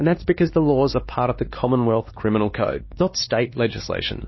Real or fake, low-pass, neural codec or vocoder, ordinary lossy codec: fake; 7.2 kHz; codec, 16 kHz, 2 kbps, X-Codec, WavLM features, trained on Multilingual LibriSpeech; MP3, 24 kbps